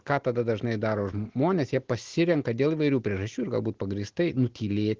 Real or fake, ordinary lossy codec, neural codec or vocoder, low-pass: real; Opus, 16 kbps; none; 7.2 kHz